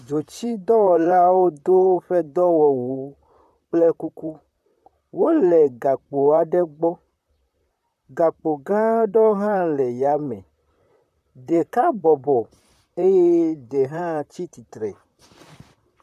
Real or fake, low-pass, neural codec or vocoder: fake; 14.4 kHz; vocoder, 44.1 kHz, 128 mel bands, Pupu-Vocoder